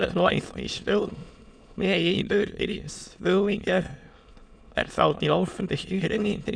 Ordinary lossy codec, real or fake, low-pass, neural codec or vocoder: none; fake; 9.9 kHz; autoencoder, 22.05 kHz, a latent of 192 numbers a frame, VITS, trained on many speakers